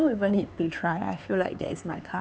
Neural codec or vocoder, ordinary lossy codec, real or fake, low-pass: codec, 16 kHz, 4 kbps, X-Codec, HuBERT features, trained on LibriSpeech; none; fake; none